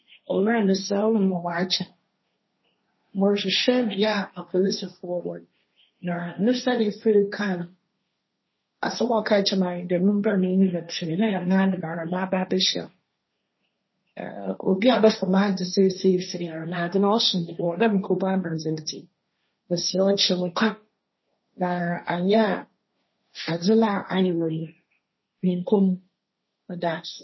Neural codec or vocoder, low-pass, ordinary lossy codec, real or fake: codec, 16 kHz, 1.1 kbps, Voila-Tokenizer; 7.2 kHz; MP3, 24 kbps; fake